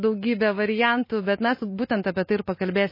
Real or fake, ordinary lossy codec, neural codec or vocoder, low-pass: real; MP3, 24 kbps; none; 5.4 kHz